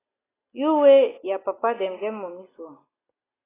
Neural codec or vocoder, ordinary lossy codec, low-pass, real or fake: none; AAC, 16 kbps; 3.6 kHz; real